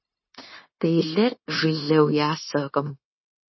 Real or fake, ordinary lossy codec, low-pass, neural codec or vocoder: fake; MP3, 24 kbps; 7.2 kHz; codec, 16 kHz, 0.9 kbps, LongCat-Audio-Codec